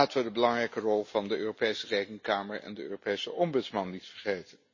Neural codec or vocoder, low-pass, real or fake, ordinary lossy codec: none; 7.2 kHz; real; MP3, 32 kbps